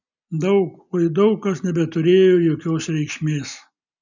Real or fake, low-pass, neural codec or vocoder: real; 7.2 kHz; none